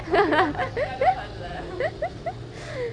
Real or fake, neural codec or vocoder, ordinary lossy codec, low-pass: real; none; Opus, 64 kbps; 9.9 kHz